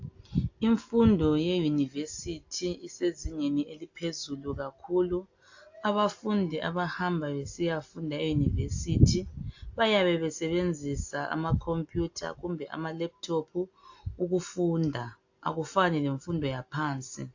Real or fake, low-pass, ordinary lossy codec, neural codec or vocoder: real; 7.2 kHz; AAC, 48 kbps; none